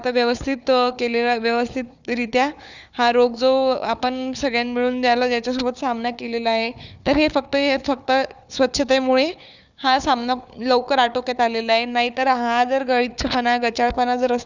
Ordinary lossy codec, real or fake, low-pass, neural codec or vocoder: none; fake; 7.2 kHz; codec, 16 kHz, 4 kbps, FunCodec, trained on Chinese and English, 50 frames a second